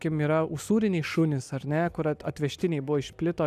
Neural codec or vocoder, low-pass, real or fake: none; 14.4 kHz; real